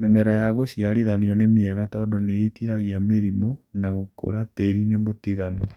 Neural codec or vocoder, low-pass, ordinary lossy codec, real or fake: codec, 44.1 kHz, 2.6 kbps, DAC; 19.8 kHz; none; fake